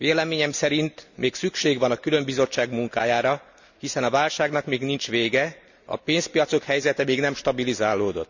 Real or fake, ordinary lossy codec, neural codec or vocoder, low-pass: real; none; none; 7.2 kHz